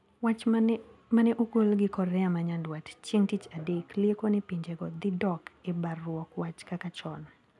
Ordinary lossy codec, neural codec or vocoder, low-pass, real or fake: none; none; none; real